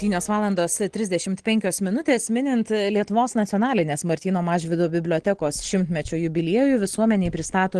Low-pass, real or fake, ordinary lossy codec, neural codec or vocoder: 9.9 kHz; real; Opus, 16 kbps; none